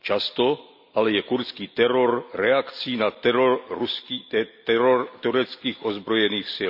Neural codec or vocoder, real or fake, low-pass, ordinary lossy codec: none; real; 5.4 kHz; none